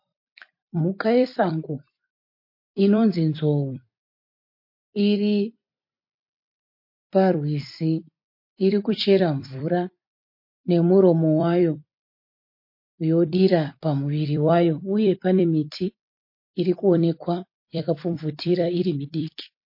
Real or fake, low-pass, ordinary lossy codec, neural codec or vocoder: fake; 5.4 kHz; MP3, 32 kbps; vocoder, 44.1 kHz, 128 mel bands every 256 samples, BigVGAN v2